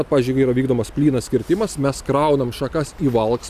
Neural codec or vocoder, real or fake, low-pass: none; real; 14.4 kHz